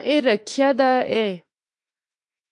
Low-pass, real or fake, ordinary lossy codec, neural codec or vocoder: 10.8 kHz; fake; AAC, 64 kbps; autoencoder, 48 kHz, 32 numbers a frame, DAC-VAE, trained on Japanese speech